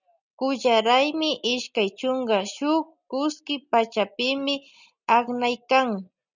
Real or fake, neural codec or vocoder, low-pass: real; none; 7.2 kHz